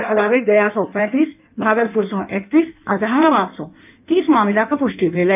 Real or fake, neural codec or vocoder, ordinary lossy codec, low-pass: fake; codec, 16 kHz in and 24 kHz out, 1.1 kbps, FireRedTTS-2 codec; none; 3.6 kHz